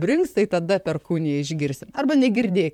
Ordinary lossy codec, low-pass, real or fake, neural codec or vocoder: MP3, 96 kbps; 19.8 kHz; fake; vocoder, 44.1 kHz, 128 mel bands, Pupu-Vocoder